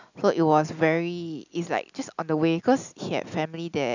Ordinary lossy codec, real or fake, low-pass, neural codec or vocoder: none; real; 7.2 kHz; none